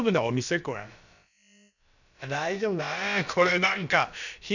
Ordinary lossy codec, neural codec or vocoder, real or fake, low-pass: none; codec, 16 kHz, about 1 kbps, DyCAST, with the encoder's durations; fake; 7.2 kHz